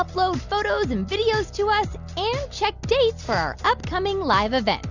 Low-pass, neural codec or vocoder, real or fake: 7.2 kHz; none; real